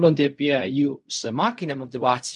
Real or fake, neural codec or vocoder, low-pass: fake; codec, 16 kHz in and 24 kHz out, 0.4 kbps, LongCat-Audio-Codec, fine tuned four codebook decoder; 10.8 kHz